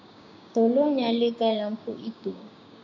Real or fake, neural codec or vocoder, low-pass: fake; codec, 16 kHz, 6 kbps, DAC; 7.2 kHz